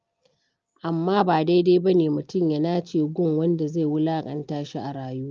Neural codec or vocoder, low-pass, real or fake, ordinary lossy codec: none; 7.2 kHz; real; Opus, 24 kbps